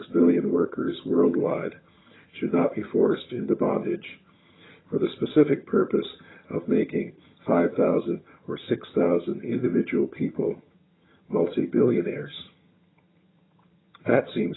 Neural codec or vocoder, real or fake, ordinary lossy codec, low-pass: vocoder, 22.05 kHz, 80 mel bands, HiFi-GAN; fake; AAC, 16 kbps; 7.2 kHz